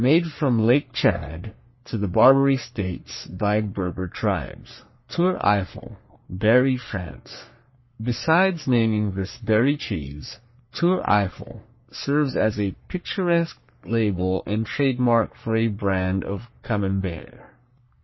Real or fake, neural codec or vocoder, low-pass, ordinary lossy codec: fake; codec, 44.1 kHz, 3.4 kbps, Pupu-Codec; 7.2 kHz; MP3, 24 kbps